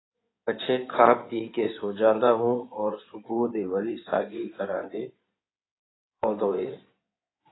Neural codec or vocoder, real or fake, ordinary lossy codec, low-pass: codec, 16 kHz in and 24 kHz out, 2.2 kbps, FireRedTTS-2 codec; fake; AAC, 16 kbps; 7.2 kHz